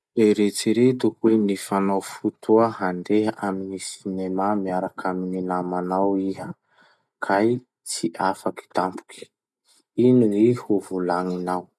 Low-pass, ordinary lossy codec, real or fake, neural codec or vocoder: none; none; real; none